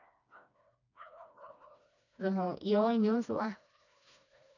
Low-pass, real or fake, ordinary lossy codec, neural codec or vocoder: 7.2 kHz; fake; none; codec, 16 kHz, 2 kbps, FreqCodec, smaller model